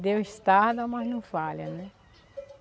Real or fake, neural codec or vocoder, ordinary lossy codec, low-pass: real; none; none; none